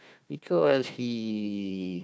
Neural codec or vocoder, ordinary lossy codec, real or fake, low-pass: codec, 16 kHz, 1 kbps, FunCodec, trained on Chinese and English, 50 frames a second; none; fake; none